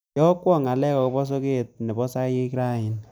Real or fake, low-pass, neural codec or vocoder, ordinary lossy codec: real; none; none; none